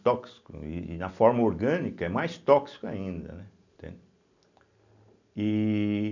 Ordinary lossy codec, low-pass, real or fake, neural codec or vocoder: none; 7.2 kHz; real; none